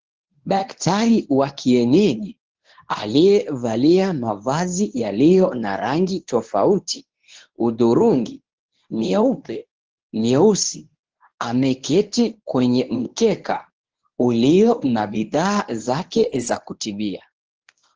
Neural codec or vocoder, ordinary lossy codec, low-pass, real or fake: codec, 24 kHz, 0.9 kbps, WavTokenizer, medium speech release version 1; Opus, 16 kbps; 7.2 kHz; fake